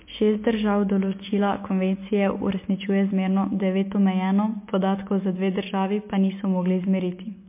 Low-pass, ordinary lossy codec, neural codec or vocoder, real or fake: 3.6 kHz; MP3, 24 kbps; none; real